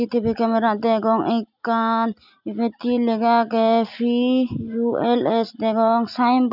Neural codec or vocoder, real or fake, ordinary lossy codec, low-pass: none; real; none; 5.4 kHz